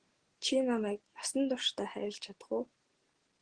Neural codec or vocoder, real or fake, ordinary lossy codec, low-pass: none; real; Opus, 16 kbps; 9.9 kHz